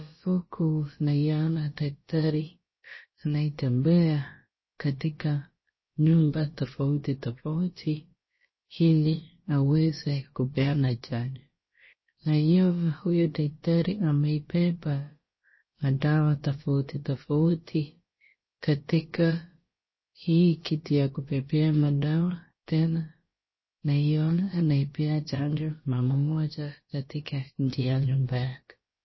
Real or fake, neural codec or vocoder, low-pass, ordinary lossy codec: fake; codec, 16 kHz, about 1 kbps, DyCAST, with the encoder's durations; 7.2 kHz; MP3, 24 kbps